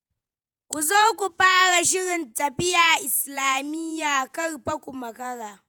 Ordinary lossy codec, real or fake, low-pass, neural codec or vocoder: none; fake; none; vocoder, 48 kHz, 128 mel bands, Vocos